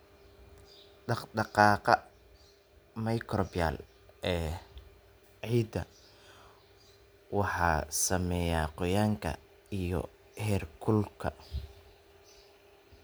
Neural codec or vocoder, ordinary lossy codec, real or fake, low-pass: none; none; real; none